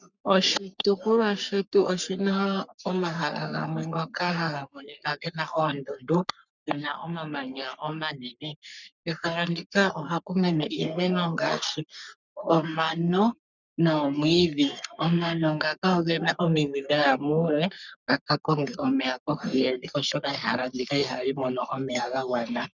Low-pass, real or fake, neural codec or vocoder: 7.2 kHz; fake; codec, 44.1 kHz, 3.4 kbps, Pupu-Codec